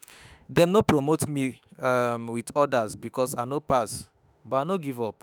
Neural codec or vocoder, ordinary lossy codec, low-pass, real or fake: autoencoder, 48 kHz, 32 numbers a frame, DAC-VAE, trained on Japanese speech; none; none; fake